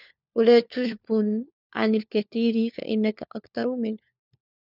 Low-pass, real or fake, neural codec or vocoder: 5.4 kHz; fake; codec, 16 kHz, 4 kbps, FunCodec, trained on LibriTTS, 50 frames a second